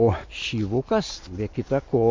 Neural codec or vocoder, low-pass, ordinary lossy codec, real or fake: none; 7.2 kHz; MP3, 48 kbps; real